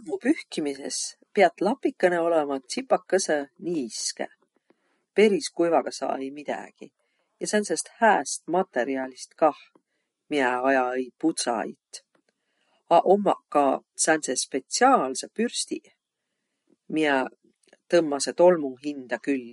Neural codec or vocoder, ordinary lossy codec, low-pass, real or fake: none; MP3, 48 kbps; 19.8 kHz; real